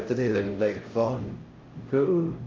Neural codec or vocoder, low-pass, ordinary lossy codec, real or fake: codec, 16 kHz, 0.5 kbps, X-Codec, HuBERT features, trained on LibriSpeech; 7.2 kHz; Opus, 24 kbps; fake